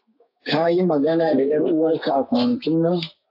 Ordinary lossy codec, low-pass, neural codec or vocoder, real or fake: AAC, 48 kbps; 5.4 kHz; codec, 32 kHz, 1.9 kbps, SNAC; fake